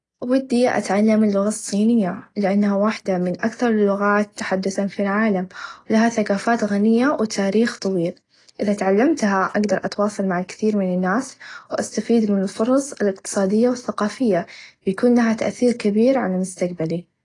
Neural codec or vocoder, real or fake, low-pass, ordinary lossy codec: none; real; 10.8 kHz; AAC, 32 kbps